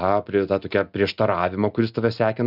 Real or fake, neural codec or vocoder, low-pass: real; none; 5.4 kHz